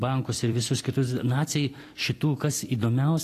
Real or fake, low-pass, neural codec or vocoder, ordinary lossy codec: fake; 14.4 kHz; vocoder, 44.1 kHz, 128 mel bands every 256 samples, BigVGAN v2; AAC, 48 kbps